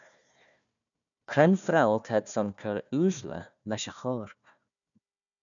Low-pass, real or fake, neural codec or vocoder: 7.2 kHz; fake; codec, 16 kHz, 1 kbps, FunCodec, trained on Chinese and English, 50 frames a second